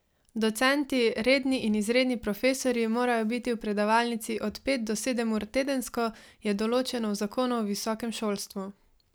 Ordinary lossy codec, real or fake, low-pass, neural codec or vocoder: none; real; none; none